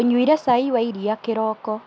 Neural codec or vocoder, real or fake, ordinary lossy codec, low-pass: none; real; none; none